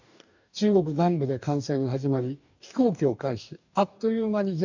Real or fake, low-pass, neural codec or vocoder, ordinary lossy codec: fake; 7.2 kHz; codec, 44.1 kHz, 2.6 kbps, DAC; none